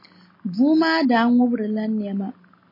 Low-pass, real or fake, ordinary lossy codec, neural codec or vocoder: 5.4 kHz; real; MP3, 24 kbps; none